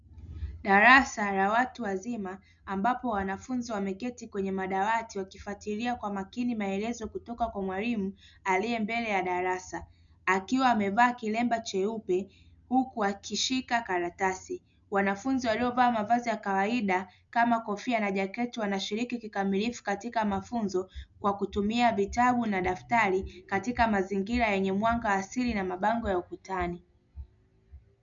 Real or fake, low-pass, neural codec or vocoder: real; 7.2 kHz; none